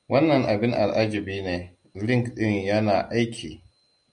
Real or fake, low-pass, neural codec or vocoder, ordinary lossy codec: real; 9.9 kHz; none; MP3, 96 kbps